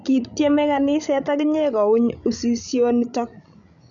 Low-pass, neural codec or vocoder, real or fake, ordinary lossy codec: 7.2 kHz; codec, 16 kHz, 16 kbps, FreqCodec, larger model; fake; none